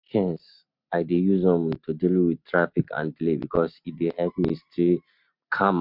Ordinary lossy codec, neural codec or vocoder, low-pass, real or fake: MP3, 48 kbps; none; 5.4 kHz; real